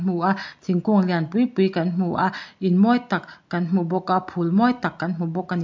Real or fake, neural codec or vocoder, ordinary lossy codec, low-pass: real; none; MP3, 48 kbps; 7.2 kHz